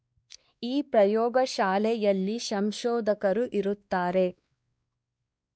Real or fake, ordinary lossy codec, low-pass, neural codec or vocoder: fake; none; none; codec, 16 kHz, 2 kbps, X-Codec, WavLM features, trained on Multilingual LibriSpeech